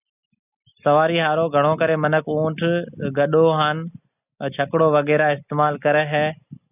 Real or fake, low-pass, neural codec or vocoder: real; 3.6 kHz; none